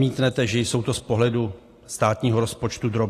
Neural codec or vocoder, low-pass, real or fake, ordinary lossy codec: none; 14.4 kHz; real; AAC, 48 kbps